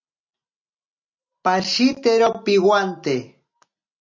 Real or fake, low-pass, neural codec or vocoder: real; 7.2 kHz; none